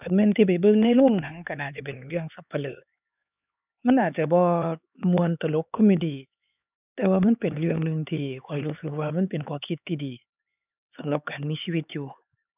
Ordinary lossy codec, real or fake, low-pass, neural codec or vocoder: none; fake; 3.6 kHz; codec, 16 kHz, 4 kbps, X-Codec, HuBERT features, trained on LibriSpeech